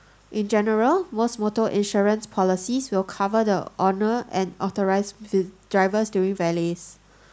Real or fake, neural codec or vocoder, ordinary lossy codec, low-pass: real; none; none; none